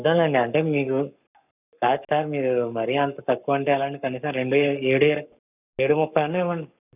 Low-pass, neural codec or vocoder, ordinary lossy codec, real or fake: 3.6 kHz; codec, 44.1 kHz, 7.8 kbps, Pupu-Codec; none; fake